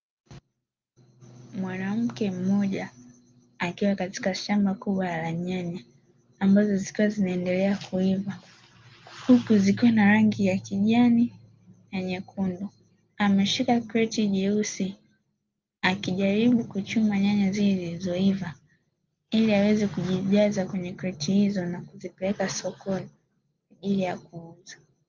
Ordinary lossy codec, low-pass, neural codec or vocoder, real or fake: Opus, 24 kbps; 7.2 kHz; none; real